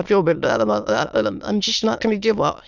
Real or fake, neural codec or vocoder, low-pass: fake; autoencoder, 22.05 kHz, a latent of 192 numbers a frame, VITS, trained on many speakers; 7.2 kHz